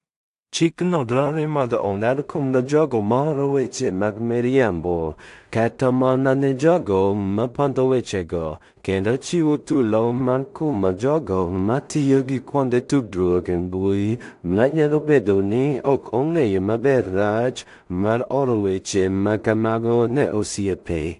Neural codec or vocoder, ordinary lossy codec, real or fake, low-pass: codec, 16 kHz in and 24 kHz out, 0.4 kbps, LongCat-Audio-Codec, two codebook decoder; AAC, 64 kbps; fake; 10.8 kHz